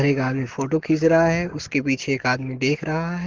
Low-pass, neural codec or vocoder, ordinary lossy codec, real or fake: 7.2 kHz; codec, 44.1 kHz, 7.8 kbps, Pupu-Codec; Opus, 16 kbps; fake